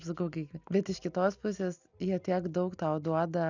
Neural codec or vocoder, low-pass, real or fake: none; 7.2 kHz; real